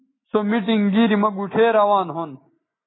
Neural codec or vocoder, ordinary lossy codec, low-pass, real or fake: none; AAC, 16 kbps; 7.2 kHz; real